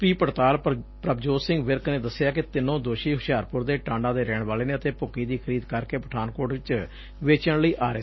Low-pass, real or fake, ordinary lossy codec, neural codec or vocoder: 7.2 kHz; real; MP3, 24 kbps; none